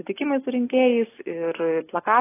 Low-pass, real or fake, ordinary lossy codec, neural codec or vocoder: 3.6 kHz; real; AAC, 16 kbps; none